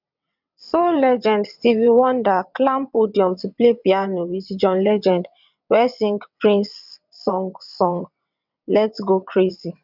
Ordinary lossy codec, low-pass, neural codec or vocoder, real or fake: none; 5.4 kHz; vocoder, 22.05 kHz, 80 mel bands, WaveNeXt; fake